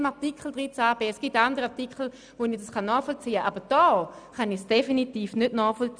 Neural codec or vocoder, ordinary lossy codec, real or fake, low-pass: none; none; real; 9.9 kHz